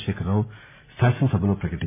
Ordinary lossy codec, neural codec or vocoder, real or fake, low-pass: MP3, 24 kbps; none; real; 3.6 kHz